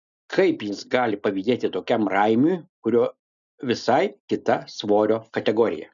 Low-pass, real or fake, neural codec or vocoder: 7.2 kHz; real; none